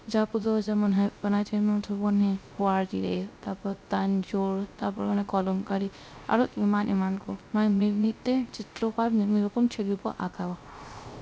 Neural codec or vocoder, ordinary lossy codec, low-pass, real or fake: codec, 16 kHz, 0.3 kbps, FocalCodec; none; none; fake